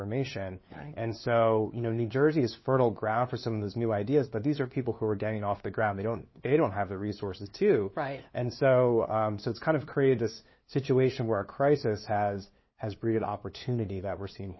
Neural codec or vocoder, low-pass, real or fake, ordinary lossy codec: codec, 16 kHz, 2 kbps, FunCodec, trained on LibriTTS, 25 frames a second; 7.2 kHz; fake; MP3, 24 kbps